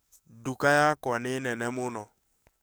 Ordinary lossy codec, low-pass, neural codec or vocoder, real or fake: none; none; codec, 44.1 kHz, 7.8 kbps, DAC; fake